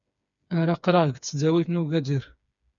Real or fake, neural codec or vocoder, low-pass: fake; codec, 16 kHz, 4 kbps, FreqCodec, smaller model; 7.2 kHz